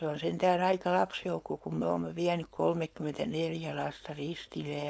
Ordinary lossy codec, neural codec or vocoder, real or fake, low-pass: none; codec, 16 kHz, 4.8 kbps, FACodec; fake; none